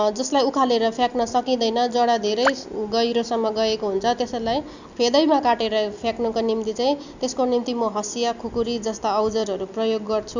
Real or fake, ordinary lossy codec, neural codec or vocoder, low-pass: real; none; none; 7.2 kHz